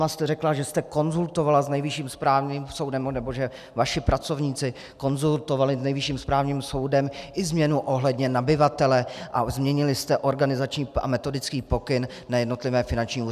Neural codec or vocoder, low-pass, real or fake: none; 14.4 kHz; real